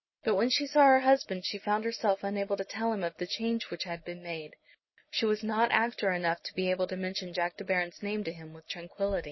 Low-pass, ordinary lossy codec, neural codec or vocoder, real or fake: 7.2 kHz; MP3, 24 kbps; none; real